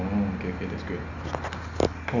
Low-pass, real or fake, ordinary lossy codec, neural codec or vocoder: 7.2 kHz; real; Opus, 64 kbps; none